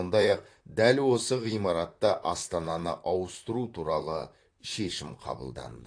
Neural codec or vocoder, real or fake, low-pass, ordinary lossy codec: vocoder, 44.1 kHz, 128 mel bands, Pupu-Vocoder; fake; 9.9 kHz; AAC, 48 kbps